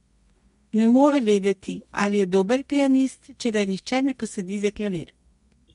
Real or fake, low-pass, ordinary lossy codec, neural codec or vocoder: fake; 10.8 kHz; MP3, 64 kbps; codec, 24 kHz, 0.9 kbps, WavTokenizer, medium music audio release